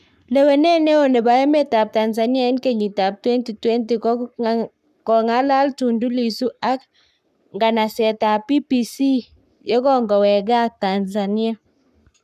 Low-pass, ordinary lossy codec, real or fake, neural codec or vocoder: 14.4 kHz; none; fake; codec, 44.1 kHz, 7.8 kbps, Pupu-Codec